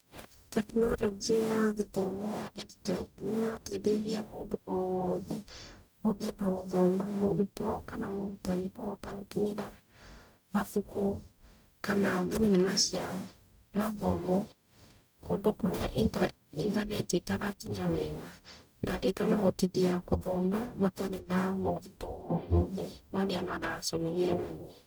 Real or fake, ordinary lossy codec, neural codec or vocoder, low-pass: fake; none; codec, 44.1 kHz, 0.9 kbps, DAC; none